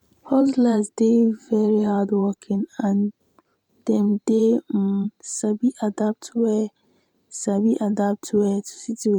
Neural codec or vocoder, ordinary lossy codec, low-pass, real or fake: vocoder, 44.1 kHz, 128 mel bands every 512 samples, BigVGAN v2; MP3, 96 kbps; 19.8 kHz; fake